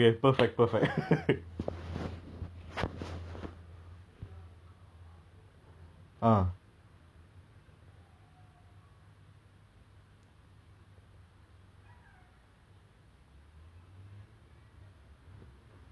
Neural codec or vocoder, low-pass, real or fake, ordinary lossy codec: none; none; real; none